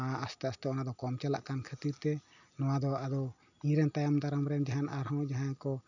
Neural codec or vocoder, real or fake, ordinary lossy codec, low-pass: none; real; none; 7.2 kHz